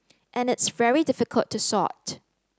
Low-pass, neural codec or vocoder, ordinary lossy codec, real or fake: none; none; none; real